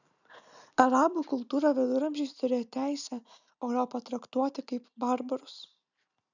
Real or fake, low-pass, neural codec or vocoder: real; 7.2 kHz; none